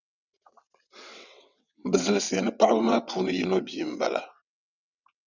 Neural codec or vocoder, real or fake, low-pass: vocoder, 22.05 kHz, 80 mel bands, WaveNeXt; fake; 7.2 kHz